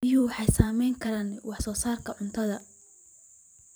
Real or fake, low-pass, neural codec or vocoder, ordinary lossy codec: fake; none; vocoder, 44.1 kHz, 128 mel bands every 512 samples, BigVGAN v2; none